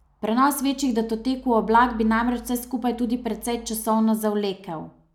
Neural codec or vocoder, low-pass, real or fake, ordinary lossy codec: none; 19.8 kHz; real; none